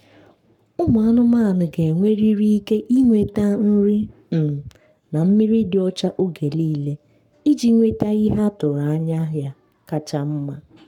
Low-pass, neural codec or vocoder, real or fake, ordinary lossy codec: 19.8 kHz; codec, 44.1 kHz, 7.8 kbps, Pupu-Codec; fake; none